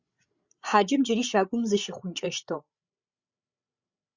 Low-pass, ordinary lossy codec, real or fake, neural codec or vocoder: 7.2 kHz; Opus, 64 kbps; fake; codec, 16 kHz, 8 kbps, FreqCodec, larger model